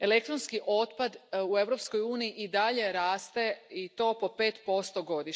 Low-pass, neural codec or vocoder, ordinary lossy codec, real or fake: none; none; none; real